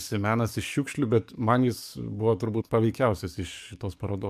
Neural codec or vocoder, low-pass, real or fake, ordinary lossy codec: codec, 44.1 kHz, 7.8 kbps, DAC; 14.4 kHz; fake; MP3, 96 kbps